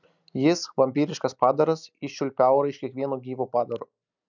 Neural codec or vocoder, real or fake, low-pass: none; real; 7.2 kHz